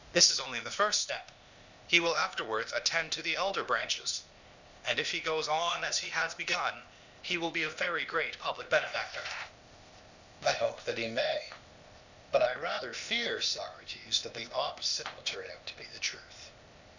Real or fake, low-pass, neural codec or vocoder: fake; 7.2 kHz; codec, 16 kHz, 0.8 kbps, ZipCodec